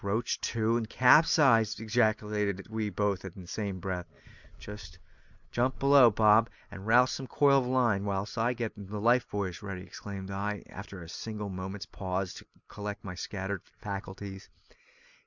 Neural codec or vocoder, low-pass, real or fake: none; 7.2 kHz; real